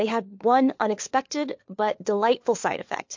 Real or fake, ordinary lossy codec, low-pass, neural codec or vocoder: fake; MP3, 48 kbps; 7.2 kHz; codec, 16 kHz, 4 kbps, FunCodec, trained on LibriTTS, 50 frames a second